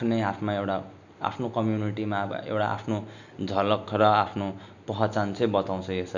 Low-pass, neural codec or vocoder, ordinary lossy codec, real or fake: 7.2 kHz; none; none; real